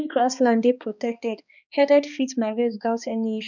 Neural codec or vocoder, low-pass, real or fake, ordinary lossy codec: codec, 16 kHz, 2 kbps, X-Codec, HuBERT features, trained on balanced general audio; 7.2 kHz; fake; none